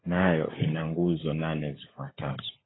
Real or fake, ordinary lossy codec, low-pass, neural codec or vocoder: fake; AAC, 16 kbps; 7.2 kHz; codec, 16 kHz, 16 kbps, FunCodec, trained on LibriTTS, 50 frames a second